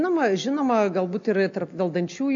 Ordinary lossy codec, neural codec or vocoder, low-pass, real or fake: AAC, 48 kbps; none; 7.2 kHz; real